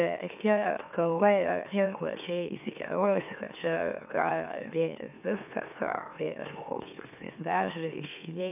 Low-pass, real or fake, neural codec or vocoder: 3.6 kHz; fake; autoencoder, 44.1 kHz, a latent of 192 numbers a frame, MeloTTS